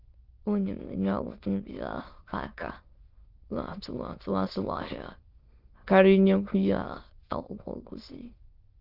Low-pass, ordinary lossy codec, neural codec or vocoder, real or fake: 5.4 kHz; Opus, 32 kbps; autoencoder, 22.05 kHz, a latent of 192 numbers a frame, VITS, trained on many speakers; fake